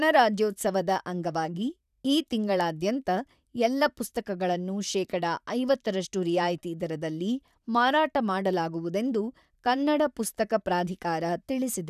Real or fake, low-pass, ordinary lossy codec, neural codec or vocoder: fake; 14.4 kHz; none; vocoder, 44.1 kHz, 128 mel bands, Pupu-Vocoder